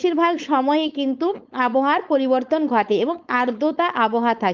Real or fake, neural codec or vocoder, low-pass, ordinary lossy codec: fake; codec, 16 kHz, 4.8 kbps, FACodec; 7.2 kHz; Opus, 24 kbps